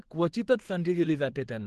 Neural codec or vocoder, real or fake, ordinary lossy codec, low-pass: codec, 24 kHz, 0.9 kbps, WavTokenizer, small release; fake; Opus, 16 kbps; 10.8 kHz